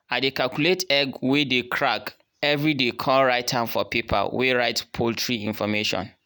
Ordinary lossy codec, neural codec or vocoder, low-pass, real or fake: none; none; none; real